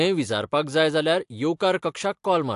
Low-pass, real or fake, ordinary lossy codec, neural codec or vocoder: 10.8 kHz; real; AAC, 64 kbps; none